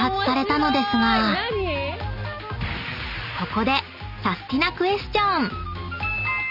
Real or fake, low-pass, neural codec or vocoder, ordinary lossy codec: real; 5.4 kHz; none; none